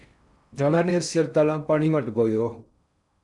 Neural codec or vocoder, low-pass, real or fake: codec, 16 kHz in and 24 kHz out, 0.6 kbps, FocalCodec, streaming, 4096 codes; 10.8 kHz; fake